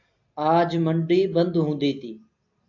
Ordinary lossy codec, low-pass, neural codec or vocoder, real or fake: AAC, 48 kbps; 7.2 kHz; none; real